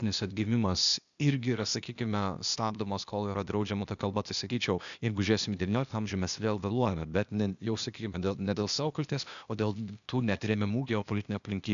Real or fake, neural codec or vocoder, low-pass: fake; codec, 16 kHz, 0.8 kbps, ZipCodec; 7.2 kHz